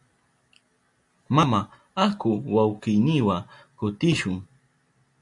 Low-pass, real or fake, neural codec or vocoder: 10.8 kHz; real; none